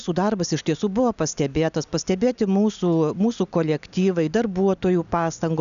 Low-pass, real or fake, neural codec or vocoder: 7.2 kHz; real; none